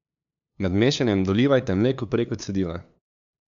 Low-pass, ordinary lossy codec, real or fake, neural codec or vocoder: 7.2 kHz; none; fake; codec, 16 kHz, 2 kbps, FunCodec, trained on LibriTTS, 25 frames a second